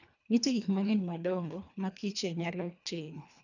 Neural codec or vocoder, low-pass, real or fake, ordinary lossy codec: codec, 24 kHz, 1.5 kbps, HILCodec; 7.2 kHz; fake; none